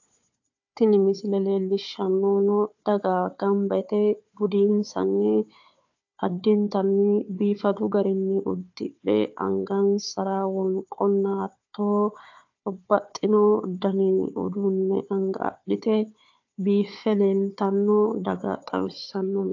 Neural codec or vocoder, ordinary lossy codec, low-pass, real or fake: codec, 16 kHz, 4 kbps, FunCodec, trained on Chinese and English, 50 frames a second; AAC, 48 kbps; 7.2 kHz; fake